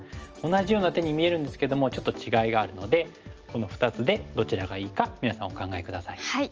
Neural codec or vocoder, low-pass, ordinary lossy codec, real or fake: none; 7.2 kHz; Opus, 24 kbps; real